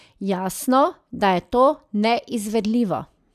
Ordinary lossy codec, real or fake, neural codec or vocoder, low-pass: none; real; none; 14.4 kHz